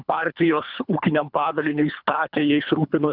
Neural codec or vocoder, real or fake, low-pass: codec, 24 kHz, 3 kbps, HILCodec; fake; 5.4 kHz